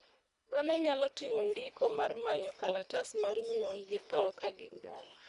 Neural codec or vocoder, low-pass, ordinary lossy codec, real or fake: codec, 24 kHz, 1.5 kbps, HILCodec; 10.8 kHz; none; fake